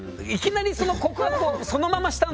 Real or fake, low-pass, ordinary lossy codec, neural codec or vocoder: real; none; none; none